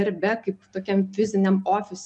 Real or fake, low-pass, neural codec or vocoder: real; 10.8 kHz; none